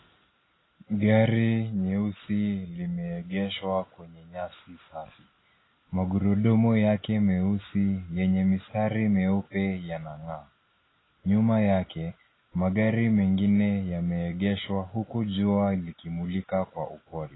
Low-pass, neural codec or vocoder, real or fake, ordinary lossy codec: 7.2 kHz; none; real; AAC, 16 kbps